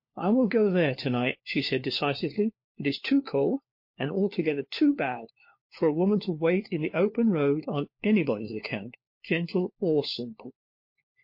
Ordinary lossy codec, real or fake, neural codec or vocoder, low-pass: MP3, 32 kbps; fake; codec, 16 kHz, 4 kbps, FunCodec, trained on LibriTTS, 50 frames a second; 5.4 kHz